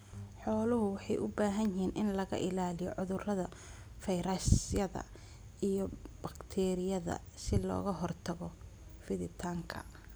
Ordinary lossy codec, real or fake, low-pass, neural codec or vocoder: none; real; none; none